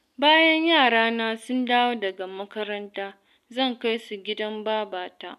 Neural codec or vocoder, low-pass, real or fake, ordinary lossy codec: none; 14.4 kHz; real; none